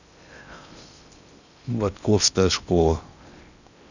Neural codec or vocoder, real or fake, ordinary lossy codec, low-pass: codec, 16 kHz in and 24 kHz out, 0.6 kbps, FocalCodec, streaming, 2048 codes; fake; none; 7.2 kHz